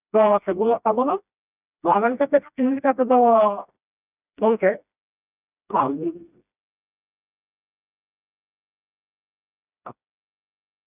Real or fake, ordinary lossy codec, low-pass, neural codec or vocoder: fake; none; 3.6 kHz; codec, 16 kHz, 1 kbps, FreqCodec, smaller model